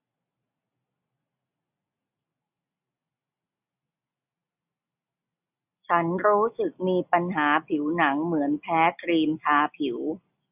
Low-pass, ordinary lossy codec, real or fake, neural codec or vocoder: 3.6 kHz; none; real; none